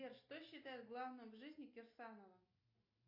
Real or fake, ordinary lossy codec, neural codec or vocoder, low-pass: real; MP3, 32 kbps; none; 5.4 kHz